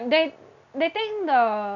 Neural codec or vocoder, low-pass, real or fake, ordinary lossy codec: none; 7.2 kHz; real; none